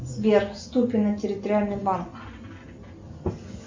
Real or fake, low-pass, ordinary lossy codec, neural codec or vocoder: real; 7.2 kHz; MP3, 64 kbps; none